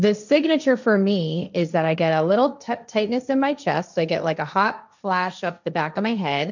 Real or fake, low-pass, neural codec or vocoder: fake; 7.2 kHz; codec, 16 kHz, 1.1 kbps, Voila-Tokenizer